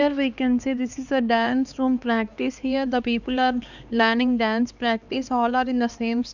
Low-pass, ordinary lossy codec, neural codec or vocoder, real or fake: 7.2 kHz; none; codec, 16 kHz, 2 kbps, X-Codec, HuBERT features, trained on LibriSpeech; fake